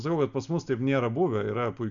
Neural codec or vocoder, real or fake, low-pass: none; real; 7.2 kHz